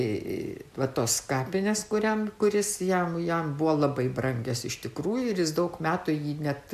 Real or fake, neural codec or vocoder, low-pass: real; none; 14.4 kHz